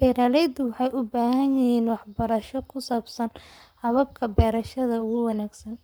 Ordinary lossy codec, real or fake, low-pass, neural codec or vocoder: none; fake; none; codec, 44.1 kHz, 7.8 kbps, Pupu-Codec